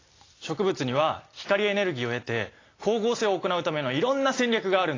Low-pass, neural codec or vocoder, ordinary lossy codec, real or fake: 7.2 kHz; none; AAC, 32 kbps; real